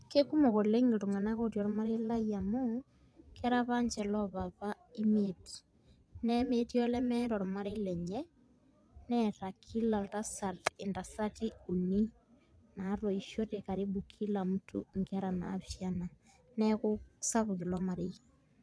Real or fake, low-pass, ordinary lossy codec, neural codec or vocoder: fake; none; none; vocoder, 22.05 kHz, 80 mel bands, Vocos